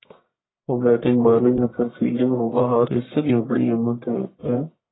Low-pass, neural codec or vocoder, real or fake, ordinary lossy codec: 7.2 kHz; codec, 44.1 kHz, 1.7 kbps, Pupu-Codec; fake; AAC, 16 kbps